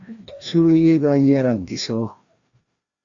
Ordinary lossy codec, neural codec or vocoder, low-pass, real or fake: Opus, 64 kbps; codec, 16 kHz, 1 kbps, FreqCodec, larger model; 7.2 kHz; fake